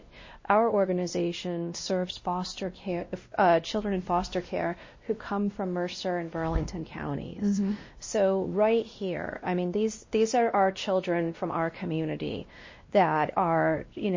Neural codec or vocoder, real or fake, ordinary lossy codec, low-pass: codec, 16 kHz, 1 kbps, X-Codec, WavLM features, trained on Multilingual LibriSpeech; fake; MP3, 32 kbps; 7.2 kHz